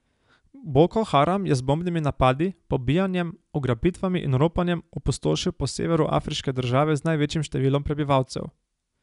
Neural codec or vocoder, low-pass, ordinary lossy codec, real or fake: none; 10.8 kHz; none; real